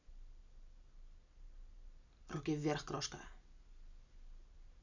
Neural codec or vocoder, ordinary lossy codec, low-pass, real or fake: none; none; 7.2 kHz; real